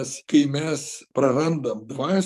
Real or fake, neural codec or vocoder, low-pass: fake; codec, 44.1 kHz, 7.8 kbps, DAC; 14.4 kHz